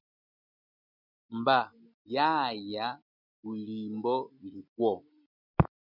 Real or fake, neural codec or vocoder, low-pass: real; none; 5.4 kHz